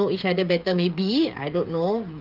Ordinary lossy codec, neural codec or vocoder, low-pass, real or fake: Opus, 24 kbps; codec, 16 kHz, 8 kbps, FreqCodec, smaller model; 5.4 kHz; fake